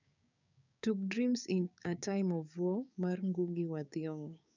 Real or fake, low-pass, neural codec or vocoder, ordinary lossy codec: fake; 7.2 kHz; codec, 16 kHz, 6 kbps, DAC; none